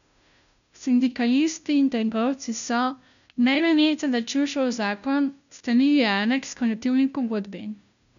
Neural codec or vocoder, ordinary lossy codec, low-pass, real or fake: codec, 16 kHz, 0.5 kbps, FunCodec, trained on Chinese and English, 25 frames a second; none; 7.2 kHz; fake